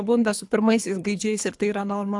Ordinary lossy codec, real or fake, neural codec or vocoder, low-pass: AAC, 64 kbps; fake; codec, 24 kHz, 3 kbps, HILCodec; 10.8 kHz